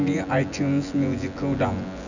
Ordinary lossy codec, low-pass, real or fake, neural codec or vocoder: none; 7.2 kHz; fake; vocoder, 24 kHz, 100 mel bands, Vocos